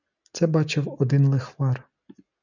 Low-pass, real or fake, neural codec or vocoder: 7.2 kHz; real; none